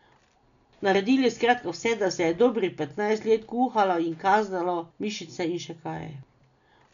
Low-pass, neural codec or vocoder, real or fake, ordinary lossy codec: 7.2 kHz; none; real; none